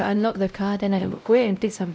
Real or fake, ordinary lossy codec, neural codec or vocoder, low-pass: fake; none; codec, 16 kHz, 0.5 kbps, X-Codec, WavLM features, trained on Multilingual LibriSpeech; none